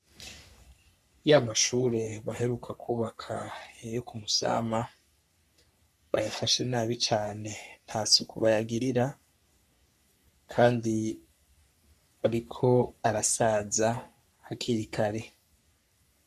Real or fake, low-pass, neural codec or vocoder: fake; 14.4 kHz; codec, 44.1 kHz, 3.4 kbps, Pupu-Codec